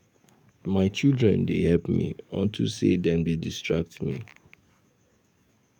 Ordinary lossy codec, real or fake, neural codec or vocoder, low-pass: none; fake; codec, 44.1 kHz, 7.8 kbps, DAC; 19.8 kHz